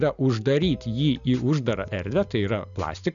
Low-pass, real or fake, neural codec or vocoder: 7.2 kHz; real; none